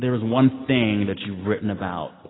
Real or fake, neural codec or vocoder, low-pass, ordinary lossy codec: real; none; 7.2 kHz; AAC, 16 kbps